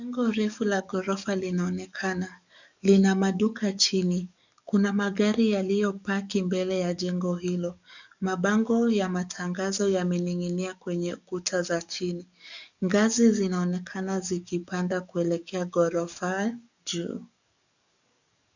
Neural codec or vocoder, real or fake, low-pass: codec, 44.1 kHz, 7.8 kbps, DAC; fake; 7.2 kHz